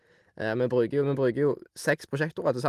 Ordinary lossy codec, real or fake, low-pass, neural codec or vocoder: Opus, 24 kbps; real; 14.4 kHz; none